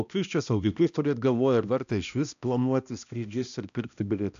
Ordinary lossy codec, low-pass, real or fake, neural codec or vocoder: AAC, 96 kbps; 7.2 kHz; fake; codec, 16 kHz, 1 kbps, X-Codec, HuBERT features, trained on balanced general audio